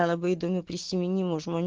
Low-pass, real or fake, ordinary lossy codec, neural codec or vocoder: 7.2 kHz; real; Opus, 16 kbps; none